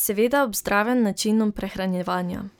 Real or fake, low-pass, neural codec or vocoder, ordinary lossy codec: real; none; none; none